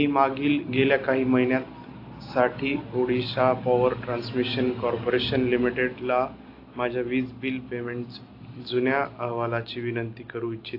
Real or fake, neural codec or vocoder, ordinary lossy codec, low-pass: real; none; AAC, 32 kbps; 5.4 kHz